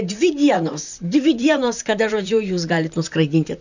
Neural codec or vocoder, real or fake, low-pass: vocoder, 44.1 kHz, 128 mel bands, Pupu-Vocoder; fake; 7.2 kHz